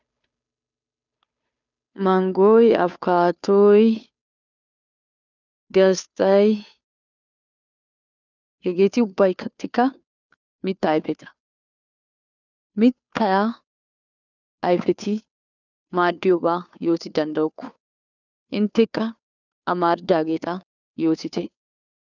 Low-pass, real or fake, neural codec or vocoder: 7.2 kHz; fake; codec, 16 kHz, 2 kbps, FunCodec, trained on Chinese and English, 25 frames a second